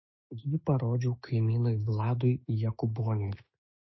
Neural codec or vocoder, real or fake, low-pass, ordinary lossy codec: autoencoder, 48 kHz, 128 numbers a frame, DAC-VAE, trained on Japanese speech; fake; 7.2 kHz; MP3, 24 kbps